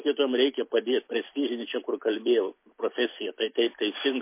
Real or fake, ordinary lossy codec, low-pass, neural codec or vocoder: real; MP3, 24 kbps; 3.6 kHz; none